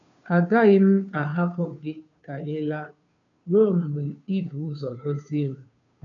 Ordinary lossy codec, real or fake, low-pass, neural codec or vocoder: none; fake; 7.2 kHz; codec, 16 kHz, 2 kbps, FunCodec, trained on Chinese and English, 25 frames a second